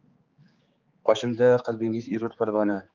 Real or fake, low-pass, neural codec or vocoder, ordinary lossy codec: fake; 7.2 kHz; codec, 16 kHz, 4 kbps, X-Codec, HuBERT features, trained on general audio; Opus, 32 kbps